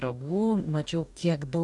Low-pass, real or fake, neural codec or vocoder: 10.8 kHz; fake; codec, 44.1 kHz, 2.6 kbps, DAC